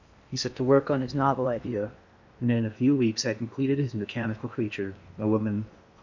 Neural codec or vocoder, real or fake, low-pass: codec, 16 kHz in and 24 kHz out, 0.8 kbps, FocalCodec, streaming, 65536 codes; fake; 7.2 kHz